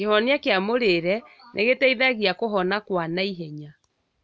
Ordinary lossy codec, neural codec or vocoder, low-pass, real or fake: none; none; none; real